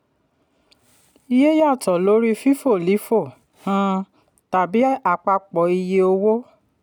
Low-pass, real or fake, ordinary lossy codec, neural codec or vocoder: 19.8 kHz; real; none; none